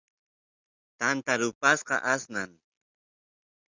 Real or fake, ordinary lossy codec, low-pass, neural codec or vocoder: real; Opus, 64 kbps; 7.2 kHz; none